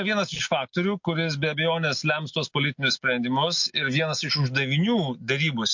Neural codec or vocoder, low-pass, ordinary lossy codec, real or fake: none; 7.2 kHz; MP3, 48 kbps; real